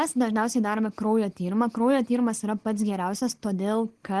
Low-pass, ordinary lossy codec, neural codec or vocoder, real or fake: 10.8 kHz; Opus, 16 kbps; none; real